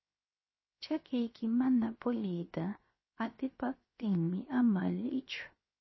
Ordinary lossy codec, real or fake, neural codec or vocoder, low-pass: MP3, 24 kbps; fake; codec, 16 kHz, 0.7 kbps, FocalCodec; 7.2 kHz